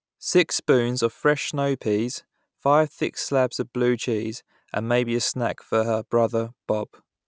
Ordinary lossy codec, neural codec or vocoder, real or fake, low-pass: none; none; real; none